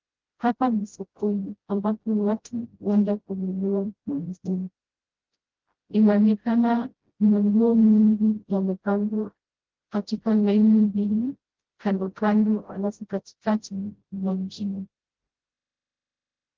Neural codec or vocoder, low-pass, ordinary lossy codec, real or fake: codec, 16 kHz, 0.5 kbps, FreqCodec, smaller model; 7.2 kHz; Opus, 16 kbps; fake